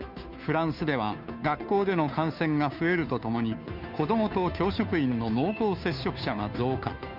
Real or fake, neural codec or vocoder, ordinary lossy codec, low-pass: fake; codec, 16 kHz, 2 kbps, FunCodec, trained on Chinese and English, 25 frames a second; none; 5.4 kHz